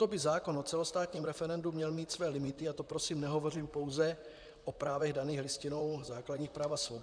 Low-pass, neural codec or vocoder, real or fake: 9.9 kHz; vocoder, 44.1 kHz, 128 mel bands, Pupu-Vocoder; fake